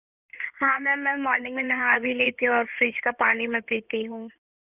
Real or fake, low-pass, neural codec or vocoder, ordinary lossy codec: fake; 3.6 kHz; codec, 16 kHz in and 24 kHz out, 2.2 kbps, FireRedTTS-2 codec; none